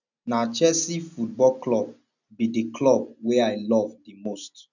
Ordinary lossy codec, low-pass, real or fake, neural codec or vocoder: none; 7.2 kHz; real; none